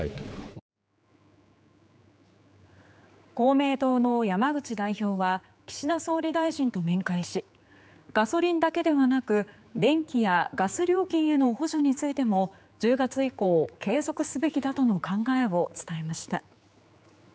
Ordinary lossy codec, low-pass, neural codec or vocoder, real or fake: none; none; codec, 16 kHz, 4 kbps, X-Codec, HuBERT features, trained on general audio; fake